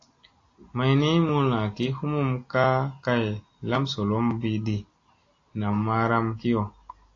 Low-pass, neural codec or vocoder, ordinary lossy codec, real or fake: 7.2 kHz; none; AAC, 32 kbps; real